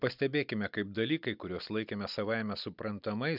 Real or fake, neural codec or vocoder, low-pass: real; none; 5.4 kHz